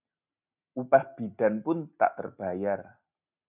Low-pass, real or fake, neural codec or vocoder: 3.6 kHz; real; none